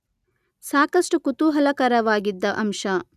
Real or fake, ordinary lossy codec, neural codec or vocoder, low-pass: real; none; none; 14.4 kHz